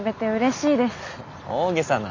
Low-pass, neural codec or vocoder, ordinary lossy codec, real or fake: 7.2 kHz; none; MP3, 64 kbps; real